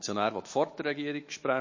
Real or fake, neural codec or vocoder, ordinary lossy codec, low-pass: fake; autoencoder, 48 kHz, 128 numbers a frame, DAC-VAE, trained on Japanese speech; MP3, 32 kbps; 7.2 kHz